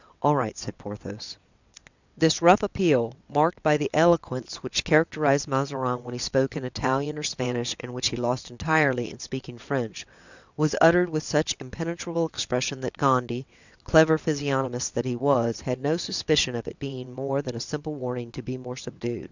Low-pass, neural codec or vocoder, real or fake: 7.2 kHz; vocoder, 22.05 kHz, 80 mel bands, WaveNeXt; fake